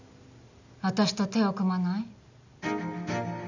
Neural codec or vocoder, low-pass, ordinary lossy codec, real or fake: none; 7.2 kHz; none; real